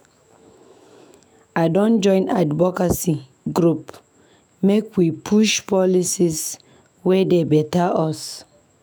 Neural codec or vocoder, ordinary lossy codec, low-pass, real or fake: autoencoder, 48 kHz, 128 numbers a frame, DAC-VAE, trained on Japanese speech; none; none; fake